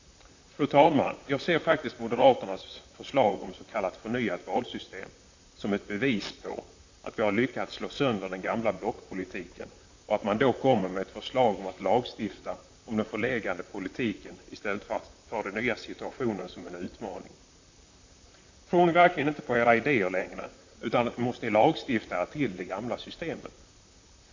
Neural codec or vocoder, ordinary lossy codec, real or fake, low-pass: vocoder, 44.1 kHz, 128 mel bands, Pupu-Vocoder; AAC, 48 kbps; fake; 7.2 kHz